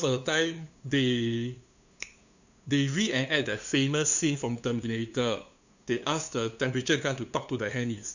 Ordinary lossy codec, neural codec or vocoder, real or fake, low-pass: none; codec, 16 kHz, 2 kbps, FunCodec, trained on LibriTTS, 25 frames a second; fake; 7.2 kHz